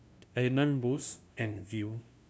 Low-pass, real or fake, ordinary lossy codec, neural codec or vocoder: none; fake; none; codec, 16 kHz, 0.5 kbps, FunCodec, trained on LibriTTS, 25 frames a second